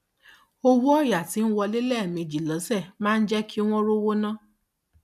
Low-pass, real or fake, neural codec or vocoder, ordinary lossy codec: 14.4 kHz; real; none; none